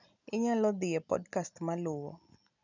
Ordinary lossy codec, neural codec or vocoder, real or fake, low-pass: none; none; real; 7.2 kHz